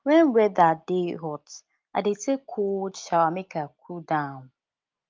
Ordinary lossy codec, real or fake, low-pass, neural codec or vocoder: Opus, 24 kbps; real; 7.2 kHz; none